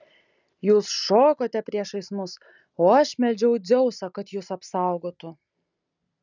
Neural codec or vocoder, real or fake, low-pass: none; real; 7.2 kHz